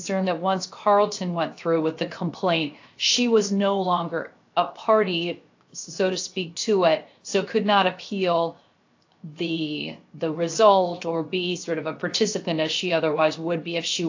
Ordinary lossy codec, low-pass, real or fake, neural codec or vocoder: AAC, 48 kbps; 7.2 kHz; fake; codec, 16 kHz, 0.7 kbps, FocalCodec